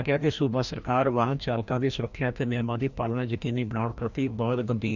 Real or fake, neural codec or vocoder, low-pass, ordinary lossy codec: fake; codec, 16 kHz, 1 kbps, FreqCodec, larger model; 7.2 kHz; none